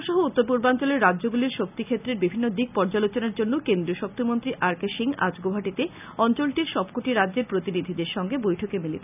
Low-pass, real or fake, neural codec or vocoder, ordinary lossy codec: 3.6 kHz; real; none; none